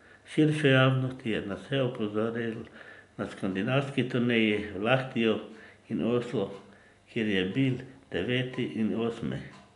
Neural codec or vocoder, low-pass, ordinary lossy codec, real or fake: none; 10.8 kHz; none; real